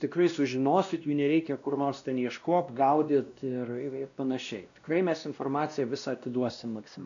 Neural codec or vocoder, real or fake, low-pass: codec, 16 kHz, 1 kbps, X-Codec, WavLM features, trained on Multilingual LibriSpeech; fake; 7.2 kHz